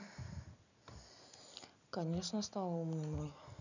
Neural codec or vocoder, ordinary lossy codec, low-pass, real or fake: none; none; 7.2 kHz; real